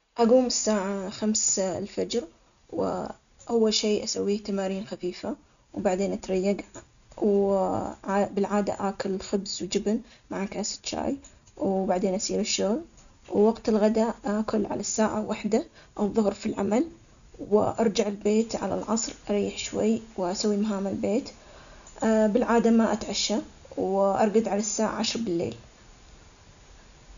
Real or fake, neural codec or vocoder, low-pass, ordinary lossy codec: real; none; 7.2 kHz; none